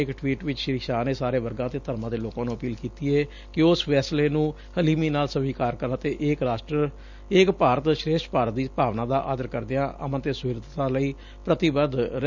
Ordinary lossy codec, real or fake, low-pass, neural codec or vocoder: none; real; 7.2 kHz; none